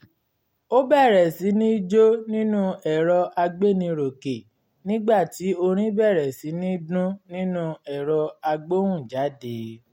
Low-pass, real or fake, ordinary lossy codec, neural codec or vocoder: 19.8 kHz; real; MP3, 64 kbps; none